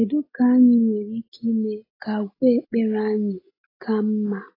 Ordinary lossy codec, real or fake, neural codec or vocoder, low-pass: AAC, 24 kbps; real; none; 5.4 kHz